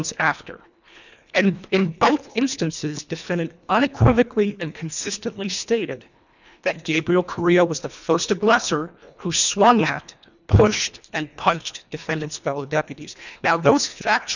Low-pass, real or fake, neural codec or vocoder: 7.2 kHz; fake; codec, 24 kHz, 1.5 kbps, HILCodec